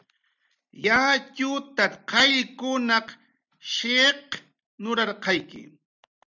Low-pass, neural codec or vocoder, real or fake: 7.2 kHz; none; real